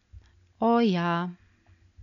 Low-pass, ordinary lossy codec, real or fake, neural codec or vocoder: 7.2 kHz; none; real; none